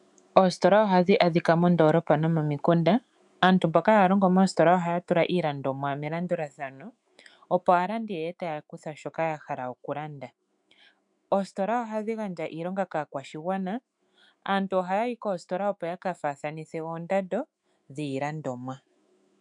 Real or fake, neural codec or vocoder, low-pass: fake; autoencoder, 48 kHz, 128 numbers a frame, DAC-VAE, trained on Japanese speech; 10.8 kHz